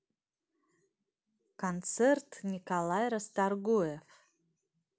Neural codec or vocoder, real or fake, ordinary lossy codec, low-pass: none; real; none; none